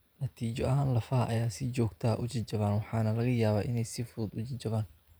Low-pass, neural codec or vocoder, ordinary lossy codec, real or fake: none; vocoder, 44.1 kHz, 128 mel bands every 512 samples, BigVGAN v2; none; fake